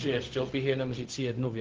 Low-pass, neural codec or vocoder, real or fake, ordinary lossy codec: 7.2 kHz; codec, 16 kHz, 0.4 kbps, LongCat-Audio-Codec; fake; Opus, 16 kbps